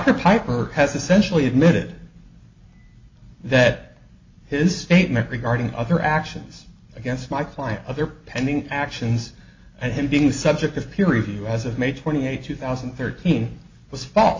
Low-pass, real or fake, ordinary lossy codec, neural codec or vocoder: 7.2 kHz; real; MP3, 48 kbps; none